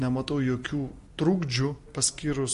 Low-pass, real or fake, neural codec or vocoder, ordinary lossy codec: 14.4 kHz; real; none; MP3, 48 kbps